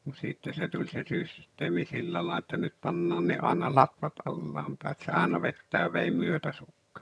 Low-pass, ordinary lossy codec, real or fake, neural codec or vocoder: none; none; fake; vocoder, 22.05 kHz, 80 mel bands, HiFi-GAN